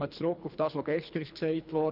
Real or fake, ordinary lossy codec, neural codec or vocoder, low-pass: fake; none; codec, 24 kHz, 3 kbps, HILCodec; 5.4 kHz